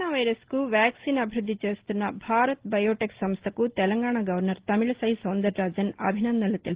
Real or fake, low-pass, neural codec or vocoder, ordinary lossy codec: real; 3.6 kHz; none; Opus, 16 kbps